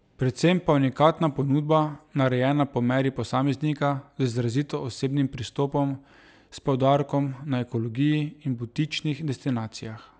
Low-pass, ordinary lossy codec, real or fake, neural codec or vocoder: none; none; real; none